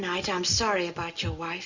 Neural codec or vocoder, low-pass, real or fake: none; 7.2 kHz; real